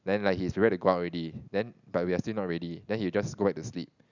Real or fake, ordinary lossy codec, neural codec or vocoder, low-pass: real; none; none; 7.2 kHz